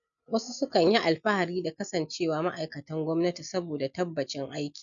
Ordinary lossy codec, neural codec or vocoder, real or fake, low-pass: MP3, 96 kbps; none; real; 7.2 kHz